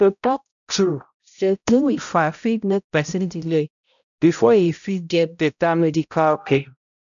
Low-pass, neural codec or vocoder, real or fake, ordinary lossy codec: 7.2 kHz; codec, 16 kHz, 0.5 kbps, X-Codec, HuBERT features, trained on balanced general audio; fake; none